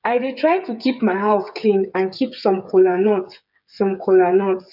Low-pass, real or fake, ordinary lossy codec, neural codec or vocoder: 5.4 kHz; fake; none; codec, 16 kHz, 8 kbps, FreqCodec, smaller model